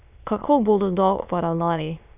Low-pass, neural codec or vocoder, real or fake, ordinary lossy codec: 3.6 kHz; autoencoder, 22.05 kHz, a latent of 192 numbers a frame, VITS, trained on many speakers; fake; none